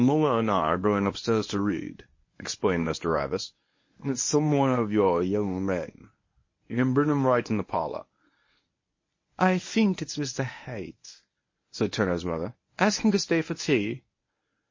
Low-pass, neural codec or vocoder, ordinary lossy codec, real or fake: 7.2 kHz; codec, 24 kHz, 0.9 kbps, WavTokenizer, medium speech release version 1; MP3, 32 kbps; fake